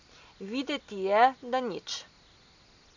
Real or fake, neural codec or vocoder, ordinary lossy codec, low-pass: real; none; none; 7.2 kHz